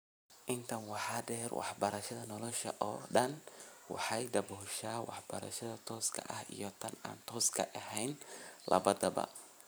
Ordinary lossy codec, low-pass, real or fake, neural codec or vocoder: none; none; fake; vocoder, 44.1 kHz, 128 mel bands every 512 samples, BigVGAN v2